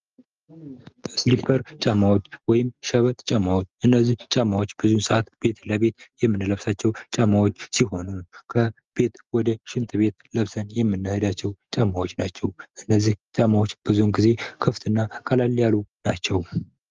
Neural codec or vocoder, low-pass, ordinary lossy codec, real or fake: none; 7.2 kHz; Opus, 32 kbps; real